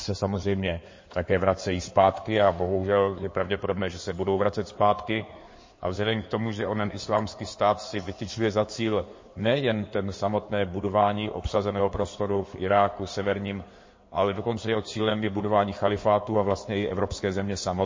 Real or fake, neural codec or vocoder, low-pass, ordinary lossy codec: fake; codec, 16 kHz in and 24 kHz out, 2.2 kbps, FireRedTTS-2 codec; 7.2 kHz; MP3, 32 kbps